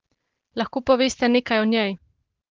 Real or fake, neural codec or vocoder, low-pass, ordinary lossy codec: real; none; 7.2 kHz; Opus, 16 kbps